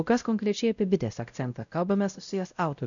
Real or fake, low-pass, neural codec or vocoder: fake; 7.2 kHz; codec, 16 kHz, 0.5 kbps, X-Codec, WavLM features, trained on Multilingual LibriSpeech